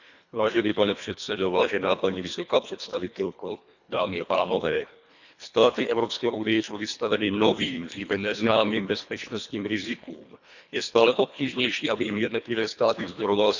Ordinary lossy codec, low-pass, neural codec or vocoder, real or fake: none; 7.2 kHz; codec, 24 kHz, 1.5 kbps, HILCodec; fake